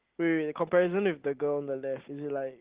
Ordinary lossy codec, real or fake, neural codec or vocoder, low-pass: Opus, 16 kbps; real; none; 3.6 kHz